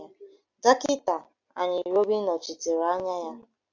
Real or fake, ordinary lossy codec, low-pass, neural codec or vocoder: real; AAC, 48 kbps; 7.2 kHz; none